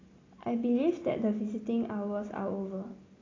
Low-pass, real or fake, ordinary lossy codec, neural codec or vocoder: 7.2 kHz; real; AAC, 32 kbps; none